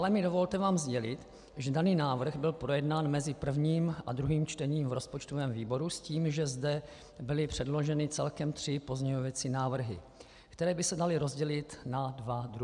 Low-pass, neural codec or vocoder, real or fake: 10.8 kHz; none; real